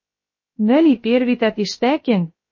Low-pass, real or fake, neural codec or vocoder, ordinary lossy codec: 7.2 kHz; fake; codec, 16 kHz, 0.3 kbps, FocalCodec; MP3, 32 kbps